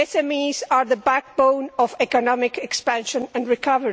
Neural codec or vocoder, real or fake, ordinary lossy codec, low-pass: none; real; none; none